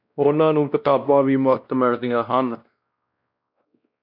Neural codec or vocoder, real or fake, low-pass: codec, 16 kHz, 1 kbps, X-Codec, WavLM features, trained on Multilingual LibriSpeech; fake; 5.4 kHz